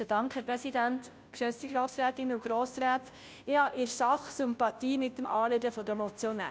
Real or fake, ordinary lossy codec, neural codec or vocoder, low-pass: fake; none; codec, 16 kHz, 0.5 kbps, FunCodec, trained on Chinese and English, 25 frames a second; none